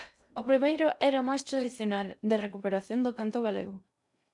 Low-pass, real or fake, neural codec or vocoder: 10.8 kHz; fake; codec, 16 kHz in and 24 kHz out, 0.6 kbps, FocalCodec, streaming, 2048 codes